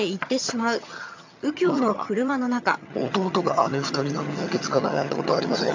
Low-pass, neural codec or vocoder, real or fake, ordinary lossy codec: 7.2 kHz; vocoder, 22.05 kHz, 80 mel bands, HiFi-GAN; fake; MP3, 64 kbps